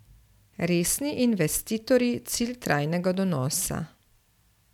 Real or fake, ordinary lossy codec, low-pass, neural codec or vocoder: real; none; 19.8 kHz; none